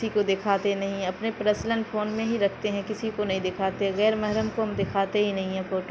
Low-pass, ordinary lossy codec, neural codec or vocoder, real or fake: none; none; none; real